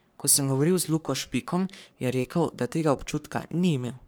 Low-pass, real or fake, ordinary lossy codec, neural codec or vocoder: none; fake; none; codec, 44.1 kHz, 3.4 kbps, Pupu-Codec